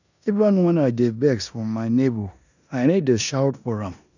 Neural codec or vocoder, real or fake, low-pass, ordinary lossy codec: codec, 16 kHz in and 24 kHz out, 0.9 kbps, LongCat-Audio-Codec, four codebook decoder; fake; 7.2 kHz; none